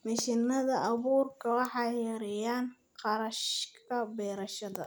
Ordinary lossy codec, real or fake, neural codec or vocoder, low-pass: none; real; none; none